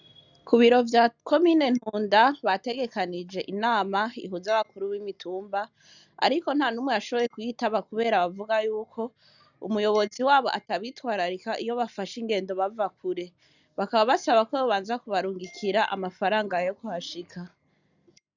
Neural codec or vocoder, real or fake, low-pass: none; real; 7.2 kHz